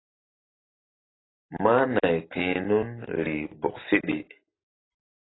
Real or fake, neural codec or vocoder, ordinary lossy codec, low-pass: real; none; AAC, 16 kbps; 7.2 kHz